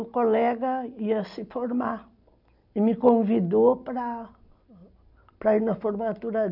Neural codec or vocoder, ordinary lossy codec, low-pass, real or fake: none; none; 5.4 kHz; real